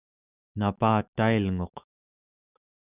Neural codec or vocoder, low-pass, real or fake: autoencoder, 48 kHz, 128 numbers a frame, DAC-VAE, trained on Japanese speech; 3.6 kHz; fake